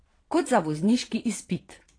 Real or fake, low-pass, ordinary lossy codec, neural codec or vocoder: fake; 9.9 kHz; AAC, 32 kbps; vocoder, 22.05 kHz, 80 mel bands, Vocos